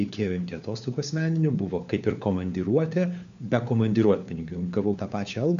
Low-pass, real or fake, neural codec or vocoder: 7.2 kHz; fake; codec, 16 kHz, 4 kbps, FunCodec, trained on LibriTTS, 50 frames a second